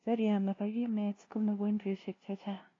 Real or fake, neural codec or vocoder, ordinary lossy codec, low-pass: fake; codec, 16 kHz, 0.5 kbps, FunCodec, trained on LibriTTS, 25 frames a second; AAC, 32 kbps; 7.2 kHz